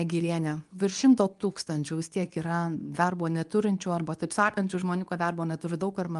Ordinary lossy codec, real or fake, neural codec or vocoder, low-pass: Opus, 32 kbps; fake; codec, 24 kHz, 0.9 kbps, WavTokenizer, medium speech release version 2; 10.8 kHz